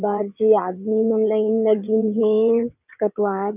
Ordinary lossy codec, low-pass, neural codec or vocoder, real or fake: none; 3.6 kHz; vocoder, 22.05 kHz, 80 mel bands, WaveNeXt; fake